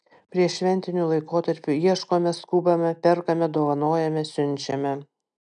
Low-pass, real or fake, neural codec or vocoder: 9.9 kHz; real; none